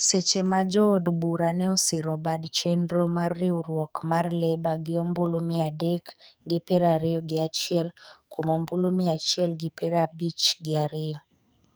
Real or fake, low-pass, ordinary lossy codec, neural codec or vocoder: fake; none; none; codec, 44.1 kHz, 2.6 kbps, SNAC